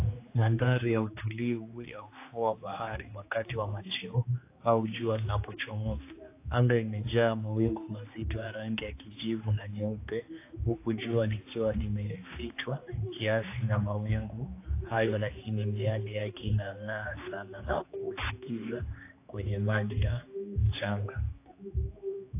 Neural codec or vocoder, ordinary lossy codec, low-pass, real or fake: codec, 16 kHz, 2 kbps, X-Codec, HuBERT features, trained on general audio; AAC, 24 kbps; 3.6 kHz; fake